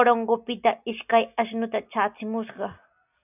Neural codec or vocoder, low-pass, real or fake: none; 3.6 kHz; real